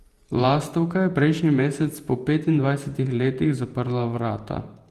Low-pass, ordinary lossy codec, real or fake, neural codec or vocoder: 14.4 kHz; Opus, 24 kbps; fake; vocoder, 48 kHz, 128 mel bands, Vocos